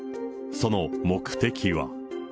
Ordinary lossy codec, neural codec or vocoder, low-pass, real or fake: none; none; none; real